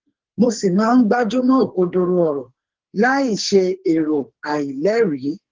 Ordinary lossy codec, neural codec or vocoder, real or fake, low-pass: Opus, 16 kbps; codec, 44.1 kHz, 2.6 kbps, SNAC; fake; 7.2 kHz